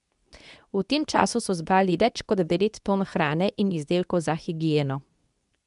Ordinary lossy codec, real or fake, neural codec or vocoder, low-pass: none; fake; codec, 24 kHz, 0.9 kbps, WavTokenizer, medium speech release version 2; 10.8 kHz